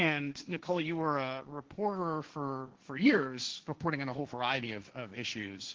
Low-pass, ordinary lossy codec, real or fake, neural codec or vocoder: 7.2 kHz; Opus, 16 kbps; fake; codec, 16 kHz, 1.1 kbps, Voila-Tokenizer